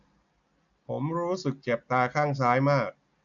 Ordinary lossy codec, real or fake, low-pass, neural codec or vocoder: none; real; 7.2 kHz; none